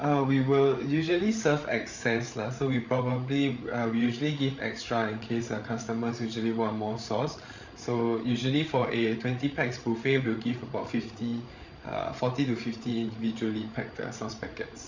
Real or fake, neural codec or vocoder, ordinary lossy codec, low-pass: fake; codec, 16 kHz, 8 kbps, FreqCodec, larger model; Opus, 64 kbps; 7.2 kHz